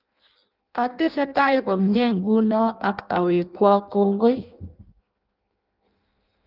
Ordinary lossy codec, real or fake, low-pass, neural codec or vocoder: Opus, 32 kbps; fake; 5.4 kHz; codec, 16 kHz in and 24 kHz out, 0.6 kbps, FireRedTTS-2 codec